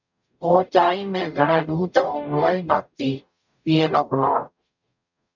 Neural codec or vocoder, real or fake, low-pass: codec, 44.1 kHz, 0.9 kbps, DAC; fake; 7.2 kHz